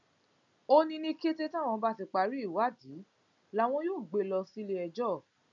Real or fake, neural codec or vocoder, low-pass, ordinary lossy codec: real; none; 7.2 kHz; none